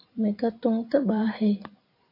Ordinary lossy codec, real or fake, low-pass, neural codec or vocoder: AAC, 32 kbps; fake; 5.4 kHz; vocoder, 44.1 kHz, 128 mel bands every 512 samples, BigVGAN v2